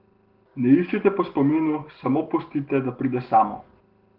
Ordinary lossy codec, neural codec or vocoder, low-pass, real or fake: Opus, 16 kbps; none; 5.4 kHz; real